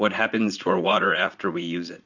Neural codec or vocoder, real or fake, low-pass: vocoder, 44.1 kHz, 80 mel bands, Vocos; fake; 7.2 kHz